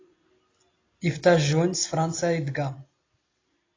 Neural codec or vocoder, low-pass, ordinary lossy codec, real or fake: none; 7.2 kHz; AAC, 32 kbps; real